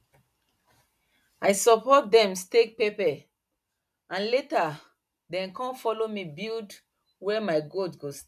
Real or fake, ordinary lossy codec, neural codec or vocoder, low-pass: real; none; none; 14.4 kHz